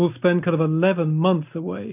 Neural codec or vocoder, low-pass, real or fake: none; 3.6 kHz; real